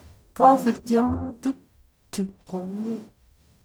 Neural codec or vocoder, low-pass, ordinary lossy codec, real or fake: codec, 44.1 kHz, 0.9 kbps, DAC; none; none; fake